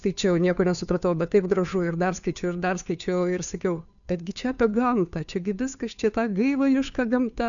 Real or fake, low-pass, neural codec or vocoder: fake; 7.2 kHz; codec, 16 kHz, 2 kbps, FunCodec, trained on Chinese and English, 25 frames a second